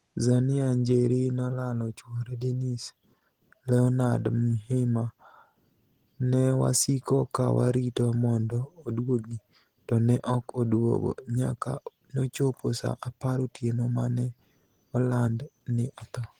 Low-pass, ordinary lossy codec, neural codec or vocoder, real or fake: 19.8 kHz; Opus, 16 kbps; none; real